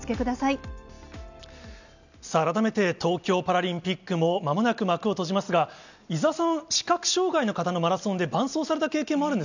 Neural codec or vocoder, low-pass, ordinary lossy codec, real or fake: none; 7.2 kHz; none; real